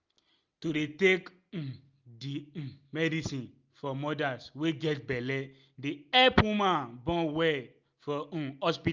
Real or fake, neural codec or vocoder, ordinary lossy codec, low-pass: real; none; Opus, 24 kbps; 7.2 kHz